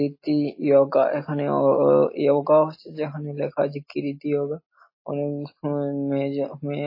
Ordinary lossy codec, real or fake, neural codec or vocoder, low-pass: MP3, 24 kbps; real; none; 5.4 kHz